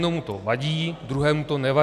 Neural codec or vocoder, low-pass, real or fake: none; 14.4 kHz; real